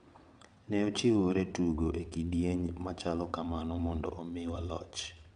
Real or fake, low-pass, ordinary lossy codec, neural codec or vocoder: fake; 9.9 kHz; none; vocoder, 22.05 kHz, 80 mel bands, WaveNeXt